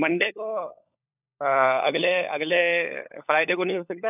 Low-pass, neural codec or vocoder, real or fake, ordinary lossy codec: 3.6 kHz; codec, 16 kHz, 16 kbps, FunCodec, trained on LibriTTS, 50 frames a second; fake; none